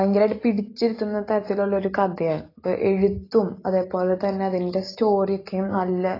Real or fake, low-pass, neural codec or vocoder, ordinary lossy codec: real; 5.4 kHz; none; AAC, 24 kbps